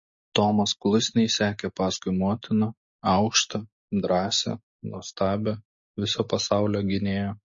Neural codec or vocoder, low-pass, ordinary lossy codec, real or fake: none; 7.2 kHz; MP3, 32 kbps; real